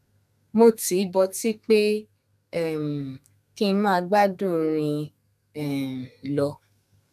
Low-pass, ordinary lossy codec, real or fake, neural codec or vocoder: 14.4 kHz; none; fake; codec, 32 kHz, 1.9 kbps, SNAC